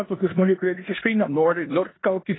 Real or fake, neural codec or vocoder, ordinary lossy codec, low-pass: fake; codec, 16 kHz in and 24 kHz out, 0.9 kbps, LongCat-Audio-Codec, four codebook decoder; AAC, 16 kbps; 7.2 kHz